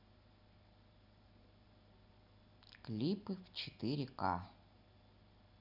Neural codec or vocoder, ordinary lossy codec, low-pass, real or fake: none; none; 5.4 kHz; real